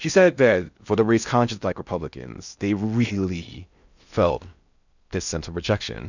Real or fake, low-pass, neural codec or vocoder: fake; 7.2 kHz; codec, 16 kHz in and 24 kHz out, 0.6 kbps, FocalCodec, streaming, 4096 codes